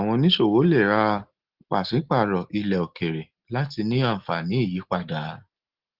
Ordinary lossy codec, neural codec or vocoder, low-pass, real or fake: Opus, 16 kbps; none; 5.4 kHz; real